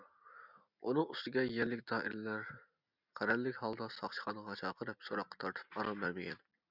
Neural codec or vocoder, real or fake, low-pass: none; real; 5.4 kHz